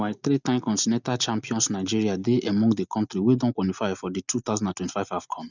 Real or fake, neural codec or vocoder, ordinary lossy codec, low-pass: real; none; none; 7.2 kHz